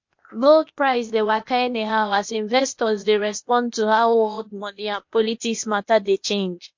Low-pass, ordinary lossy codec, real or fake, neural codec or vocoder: 7.2 kHz; MP3, 48 kbps; fake; codec, 16 kHz, 0.8 kbps, ZipCodec